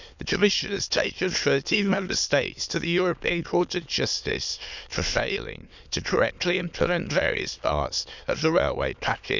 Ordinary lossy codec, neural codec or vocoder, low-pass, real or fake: none; autoencoder, 22.05 kHz, a latent of 192 numbers a frame, VITS, trained on many speakers; 7.2 kHz; fake